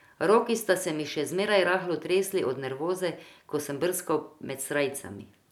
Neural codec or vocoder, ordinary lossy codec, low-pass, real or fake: none; none; 19.8 kHz; real